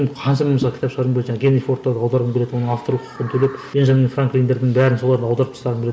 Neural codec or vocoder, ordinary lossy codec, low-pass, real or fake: none; none; none; real